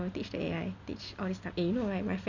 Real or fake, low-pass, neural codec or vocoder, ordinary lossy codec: real; 7.2 kHz; none; none